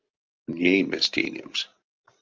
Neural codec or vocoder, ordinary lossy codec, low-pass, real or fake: none; Opus, 24 kbps; 7.2 kHz; real